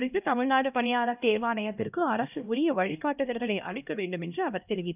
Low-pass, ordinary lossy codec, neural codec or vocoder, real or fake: 3.6 kHz; none; codec, 16 kHz, 1 kbps, X-Codec, HuBERT features, trained on LibriSpeech; fake